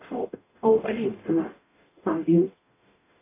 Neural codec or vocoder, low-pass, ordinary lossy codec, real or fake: codec, 44.1 kHz, 0.9 kbps, DAC; 3.6 kHz; AAC, 24 kbps; fake